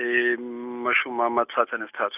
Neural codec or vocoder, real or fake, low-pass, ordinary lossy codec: none; real; 3.6 kHz; none